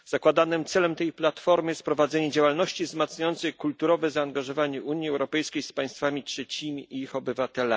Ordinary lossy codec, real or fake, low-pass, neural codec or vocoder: none; real; none; none